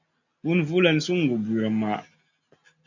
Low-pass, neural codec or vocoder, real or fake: 7.2 kHz; none; real